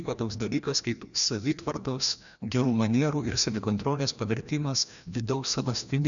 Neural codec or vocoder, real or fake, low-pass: codec, 16 kHz, 1 kbps, FreqCodec, larger model; fake; 7.2 kHz